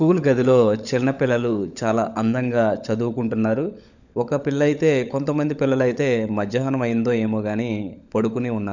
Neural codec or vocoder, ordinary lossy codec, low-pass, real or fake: codec, 16 kHz, 16 kbps, FunCodec, trained on LibriTTS, 50 frames a second; none; 7.2 kHz; fake